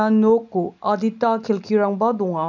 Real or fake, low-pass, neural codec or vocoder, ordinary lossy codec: real; 7.2 kHz; none; none